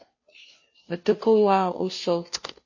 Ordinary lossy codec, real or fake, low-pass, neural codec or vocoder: MP3, 32 kbps; fake; 7.2 kHz; codec, 16 kHz, 0.5 kbps, FunCodec, trained on Chinese and English, 25 frames a second